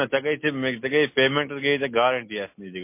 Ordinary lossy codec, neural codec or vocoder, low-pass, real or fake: MP3, 24 kbps; none; 3.6 kHz; real